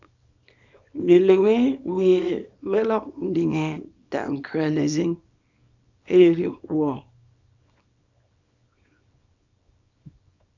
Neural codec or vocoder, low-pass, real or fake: codec, 24 kHz, 0.9 kbps, WavTokenizer, small release; 7.2 kHz; fake